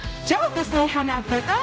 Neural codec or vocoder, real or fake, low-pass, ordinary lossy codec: codec, 16 kHz, 0.5 kbps, X-Codec, HuBERT features, trained on general audio; fake; none; none